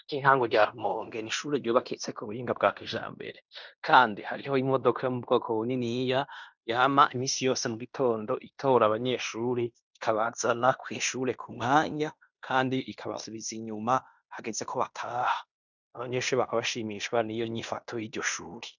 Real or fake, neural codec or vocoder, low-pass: fake; codec, 16 kHz in and 24 kHz out, 0.9 kbps, LongCat-Audio-Codec, fine tuned four codebook decoder; 7.2 kHz